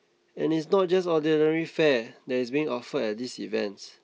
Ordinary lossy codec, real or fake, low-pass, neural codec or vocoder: none; real; none; none